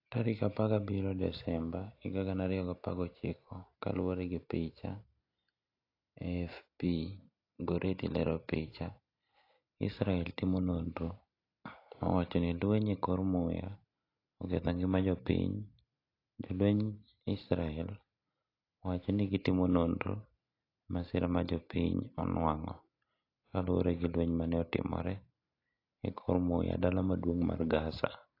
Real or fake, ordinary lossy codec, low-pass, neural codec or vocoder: real; AAC, 32 kbps; 5.4 kHz; none